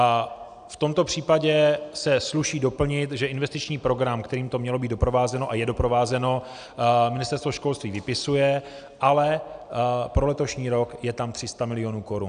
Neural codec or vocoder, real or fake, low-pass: none; real; 9.9 kHz